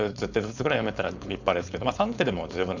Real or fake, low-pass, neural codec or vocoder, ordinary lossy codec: fake; 7.2 kHz; codec, 16 kHz, 4.8 kbps, FACodec; none